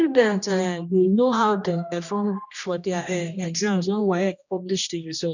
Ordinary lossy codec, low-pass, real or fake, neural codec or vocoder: none; 7.2 kHz; fake; codec, 16 kHz, 1 kbps, X-Codec, HuBERT features, trained on general audio